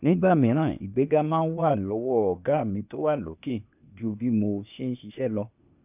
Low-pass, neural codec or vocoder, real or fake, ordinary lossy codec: 3.6 kHz; codec, 16 kHz, 0.8 kbps, ZipCodec; fake; none